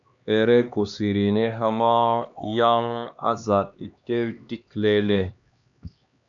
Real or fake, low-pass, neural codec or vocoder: fake; 7.2 kHz; codec, 16 kHz, 2 kbps, X-Codec, HuBERT features, trained on LibriSpeech